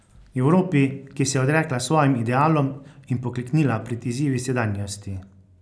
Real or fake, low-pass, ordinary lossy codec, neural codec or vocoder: real; none; none; none